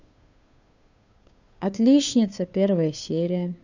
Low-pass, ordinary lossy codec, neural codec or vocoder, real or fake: 7.2 kHz; none; codec, 16 kHz, 2 kbps, FunCodec, trained on Chinese and English, 25 frames a second; fake